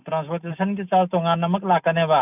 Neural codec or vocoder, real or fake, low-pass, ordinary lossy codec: none; real; 3.6 kHz; none